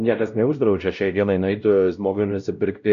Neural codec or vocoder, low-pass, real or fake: codec, 16 kHz, 0.5 kbps, X-Codec, WavLM features, trained on Multilingual LibriSpeech; 7.2 kHz; fake